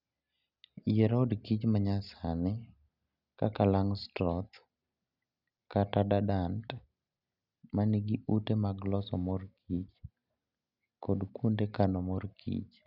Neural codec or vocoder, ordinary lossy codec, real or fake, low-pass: none; none; real; 5.4 kHz